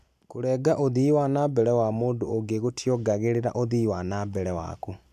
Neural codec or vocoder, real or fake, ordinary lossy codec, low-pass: none; real; none; 14.4 kHz